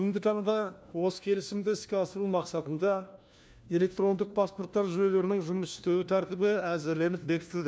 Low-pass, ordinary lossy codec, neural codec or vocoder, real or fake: none; none; codec, 16 kHz, 1 kbps, FunCodec, trained on LibriTTS, 50 frames a second; fake